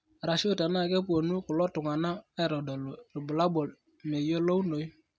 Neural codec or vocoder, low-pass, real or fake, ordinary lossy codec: none; none; real; none